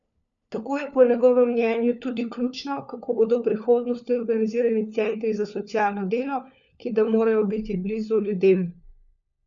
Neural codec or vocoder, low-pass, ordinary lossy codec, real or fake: codec, 16 kHz, 4 kbps, FunCodec, trained on LibriTTS, 50 frames a second; 7.2 kHz; none; fake